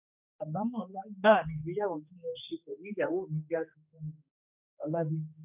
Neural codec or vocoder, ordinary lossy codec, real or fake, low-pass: codec, 16 kHz, 2 kbps, X-Codec, HuBERT features, trained on general audio; none; fake; 3.6 kHz